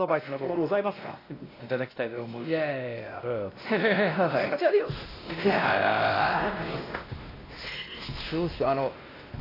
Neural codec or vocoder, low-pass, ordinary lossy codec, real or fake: codec, 16 kHz, 1 kbps, X-Codec, WavLM features, trained on Multilingual LibriSpeech; 5.4 kHz; AAC, 24 kbps; fake